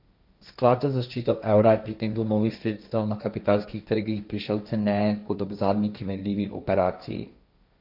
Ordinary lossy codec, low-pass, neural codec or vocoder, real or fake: none; 5.4 kHz; codec, 16 kHz, 1.1 kbps, Voila-Tokenizer; fake